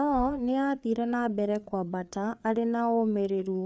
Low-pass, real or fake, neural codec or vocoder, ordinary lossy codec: none; fake; codec, 16 kHz, 4 kbps, FreqCodec, larger model; none